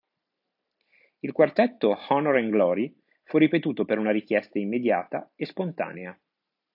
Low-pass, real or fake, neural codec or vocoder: 5.4 kHz; real; none